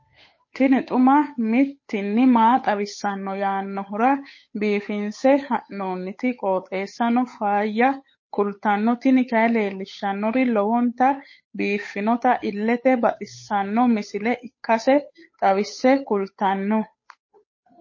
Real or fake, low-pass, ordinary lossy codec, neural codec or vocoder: fake; 7.2 kHz; MP3, 32 kbps; codec, 16 kHz, 8 kbps, FunCodec, trained on Chinese and English, 25 frames a second